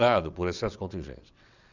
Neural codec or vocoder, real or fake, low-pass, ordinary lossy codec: vocoder, 22.05 kHz, 80 mel bands, WaveNeXt; fake; 7.2 kHz; none